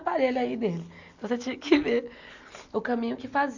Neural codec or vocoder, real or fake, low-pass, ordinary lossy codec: none; real; 7.2 kHz; none